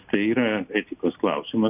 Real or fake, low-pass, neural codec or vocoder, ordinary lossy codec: real; 3.6 kHz; none; AAC, 32 kbps